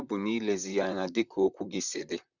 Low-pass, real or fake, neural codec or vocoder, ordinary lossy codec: 7.2 kHz; fake; vocoder, 44.1 kHz, 128 mel bands, Pupu-Vocoder; none